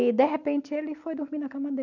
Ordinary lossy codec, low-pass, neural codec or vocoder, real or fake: none; 7.2 kHz; none; real